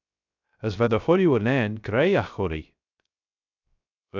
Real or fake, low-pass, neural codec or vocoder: fake; 7.2 kHz; codec, 16 kHz, 0.3 kbps, FocalCodec